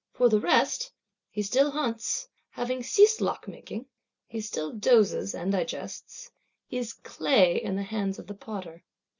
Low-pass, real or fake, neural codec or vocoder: 7.2 kHz; real; none